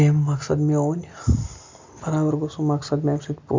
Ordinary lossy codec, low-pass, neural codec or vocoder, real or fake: MP3, 64 kbps; 7.2 kHz; none; real